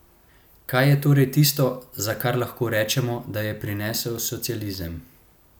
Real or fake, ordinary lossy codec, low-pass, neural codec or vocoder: real; none; none; none